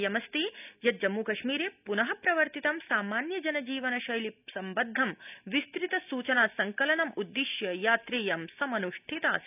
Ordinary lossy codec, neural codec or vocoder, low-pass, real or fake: none; none; 3.6 kHz; real